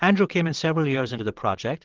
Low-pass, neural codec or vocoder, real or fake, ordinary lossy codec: 7.2 kHz; vocoder, 22.05 kHz, 80 mel bands, WaveNeXt; fake; Opus, 16 kbps